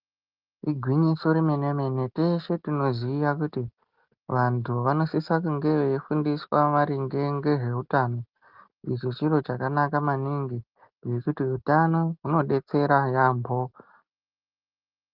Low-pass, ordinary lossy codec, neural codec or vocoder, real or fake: 5.4 kHz; Opus, 32 kbps; none; real